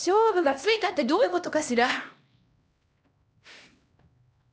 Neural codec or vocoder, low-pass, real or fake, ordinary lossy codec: codec, 16 kHz, 1 kbps, X-Codec, HuBERT features, trained on LibriSpeech; none; fake; none